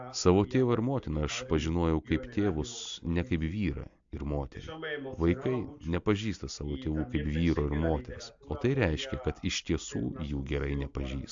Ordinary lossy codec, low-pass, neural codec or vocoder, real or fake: AAC, 64 kbps; 7.2 kHz; none; real